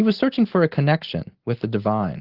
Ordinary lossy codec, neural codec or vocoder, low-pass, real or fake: Opus, 16 kbps; none; 5.4 kHz; real